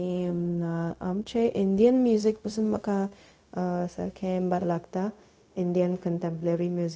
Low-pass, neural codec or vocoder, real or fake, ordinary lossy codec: none; codec, 16 kHz, 0.4 kbps, LongCat-Audio-Codec; fake; none